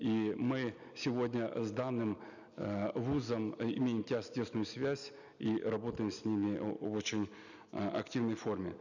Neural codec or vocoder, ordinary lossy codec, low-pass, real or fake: none; none; 7.2 kHz; real